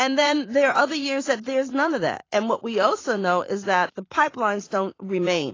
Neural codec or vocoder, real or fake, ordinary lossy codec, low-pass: vocoder, 44.1 kHz, 80 mel bands, Vocos; fake; AAC, 32 kbps; 7.2 kHz